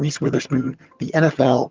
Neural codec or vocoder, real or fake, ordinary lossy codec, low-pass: vocoder, 22.05 kHz, 80 mel bands, HiFi-GAN; fake; Opus, 32 kbps; 7.2 kHz